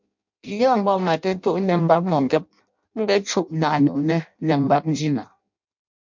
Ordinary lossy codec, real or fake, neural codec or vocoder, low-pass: MP3, 64 kbps; fake; codec, 16 kHz in and 24 kHz out, 0.6 kbps, FireRedTTS-2 codec; 7.2 kHz